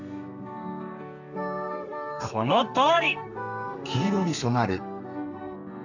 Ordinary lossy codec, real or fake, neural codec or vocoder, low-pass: none; fake; codec, 32 kHz, 1.9 kbps, SNAC; 7.2 kHz